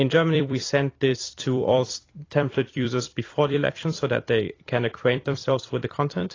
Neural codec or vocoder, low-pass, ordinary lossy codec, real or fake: vocoder, 44.1 kHz, 128 mel bands every 256 samples, BigVGAN v2; 7.2 kHz; AAC, 32 kbps; fake